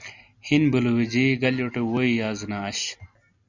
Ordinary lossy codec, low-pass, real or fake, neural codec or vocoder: Opus, 64 kbps; 7.2 kHz; real; none